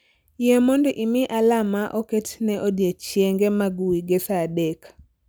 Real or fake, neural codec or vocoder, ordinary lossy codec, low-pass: real; none; none; none